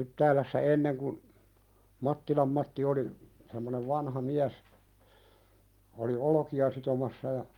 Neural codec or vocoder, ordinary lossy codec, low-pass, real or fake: none; Opus, 32 kbps; 19.8 kHz; real